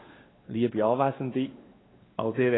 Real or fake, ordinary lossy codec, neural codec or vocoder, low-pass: fake; AAC, 16 kbps; codec, 16 kHz, 2 kbps, X-Codec, WavLM features, trained on Multilingual LibriSpeech; 7.2 kHz